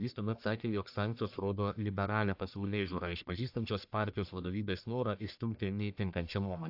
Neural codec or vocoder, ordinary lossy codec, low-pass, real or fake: codec, 44.1 kHz, 1.7 kbps, Pupu-Codec; AAC, 48 kbps; 5.4 kHz; fake